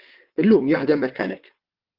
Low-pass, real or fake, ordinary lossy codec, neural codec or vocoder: 5.4 kHz; fake; Opus, 32 kbps; vocoder, 22.05 kHz, 80 mel bands, WaveNeXt